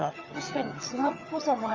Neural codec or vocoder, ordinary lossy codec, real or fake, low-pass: vocoder, 22.05 kHz, 80 mel bands, HiFi-GAN; Opus, 32 kbps; fake; 7.2 kHz